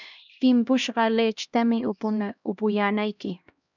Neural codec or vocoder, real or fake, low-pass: codec, 16 kHz, 1 kbps, X-Codec, HuBERT features, trained on LibriSpeech; fake; 7.2 kHz